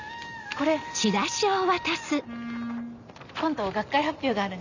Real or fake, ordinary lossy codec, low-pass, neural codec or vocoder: real; none; 7.2 kHz; none